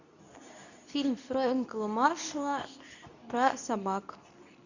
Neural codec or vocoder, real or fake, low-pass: codec, 24 kHz, 0.9 kbps, WavTokenizer, medium speech release version 2; fake; 7.2 kHz